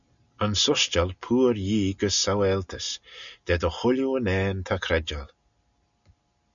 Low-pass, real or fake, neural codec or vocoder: 7.2 kHz; real; none